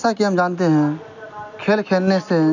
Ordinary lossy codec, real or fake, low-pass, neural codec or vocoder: none; real; 7.2 kHz; none